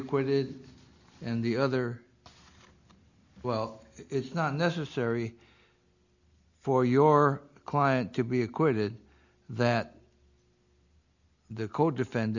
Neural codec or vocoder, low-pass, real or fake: none; 7.2 kHz; real